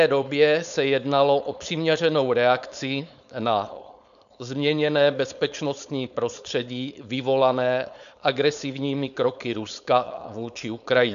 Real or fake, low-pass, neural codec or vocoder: fake; 7.2 kHz; codec, 16 kHz, 4.8 kbps, FACodec